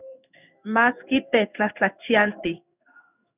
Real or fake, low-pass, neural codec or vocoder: fake; 3.6 kHz; codec, 16 kHz in and 24 kHz out, 1 kbps, XY-Tokenizer